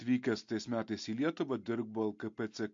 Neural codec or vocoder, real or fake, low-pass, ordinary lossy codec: none; real; 7.2 kHz; MP3, 48 kbps